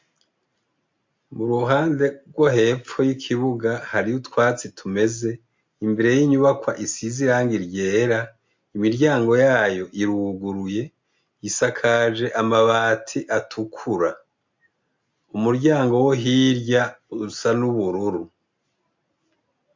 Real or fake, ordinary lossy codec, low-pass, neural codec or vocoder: real; MP3, 48 kbps; 7.2 kHz; none